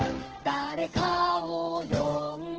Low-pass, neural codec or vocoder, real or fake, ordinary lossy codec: 7.2 kHz; codec, 16 kHz, 8 kbps, FreqCodec, smaller model; fake; Opus, 16 kbps